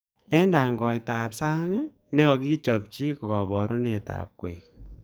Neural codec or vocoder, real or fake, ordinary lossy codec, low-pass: codec, 44.1 kHz, 2.6 kbps, SNAC; fake; none; none